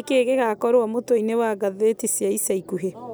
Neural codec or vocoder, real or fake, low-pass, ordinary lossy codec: none; real; none; none